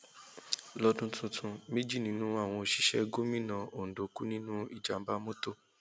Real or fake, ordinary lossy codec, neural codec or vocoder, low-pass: real; none; none; none